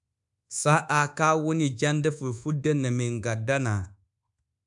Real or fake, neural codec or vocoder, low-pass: fake; codec, 24 kHz, 1.2 kbps, DualCodec; 10.8 kHz